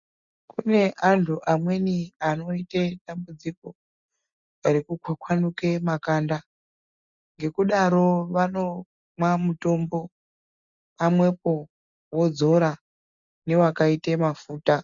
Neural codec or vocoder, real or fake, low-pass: none; real; 7.2 kHz